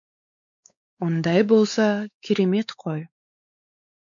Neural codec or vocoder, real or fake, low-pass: codec, 16 kHz, 4 kbps, X-Codec, WavLM features, trained on Multilingual LibriSpeech; fake; 7.2 kHz